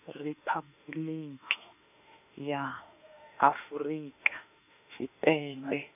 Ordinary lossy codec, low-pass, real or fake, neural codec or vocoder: none; 3.6 kHz; fake; autoencoder, 48 kHz, 32 numbers a frame, DAC-VAE, trained on Japanese speech